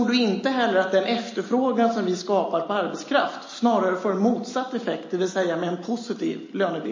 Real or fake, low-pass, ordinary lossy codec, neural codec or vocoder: real; 7.2 kHz; MP3, 32 kbps; none